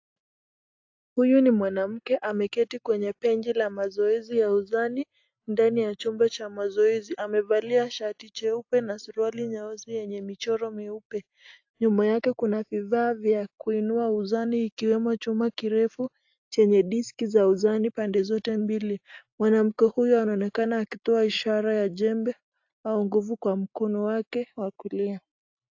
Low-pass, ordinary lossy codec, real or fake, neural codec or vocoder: 7.2 kHz; AAC, 48 kbps; real; none